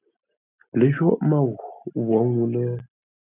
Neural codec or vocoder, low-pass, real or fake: none; 3.6 kHz; real